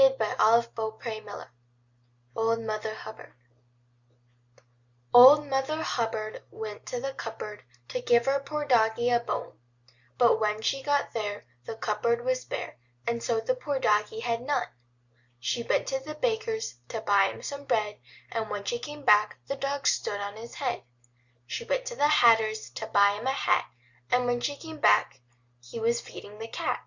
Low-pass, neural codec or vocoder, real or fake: 7.2 kHz; none; real